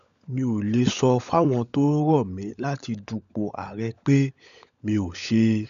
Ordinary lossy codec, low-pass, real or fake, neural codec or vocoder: none; 7.2 kHz; fake; codec, 16 kHz, 16 kbps, FunCodec, trained on LibriTTS, 50 frames a second